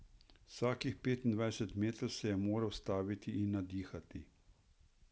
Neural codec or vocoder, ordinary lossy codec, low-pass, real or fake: none; none; none; real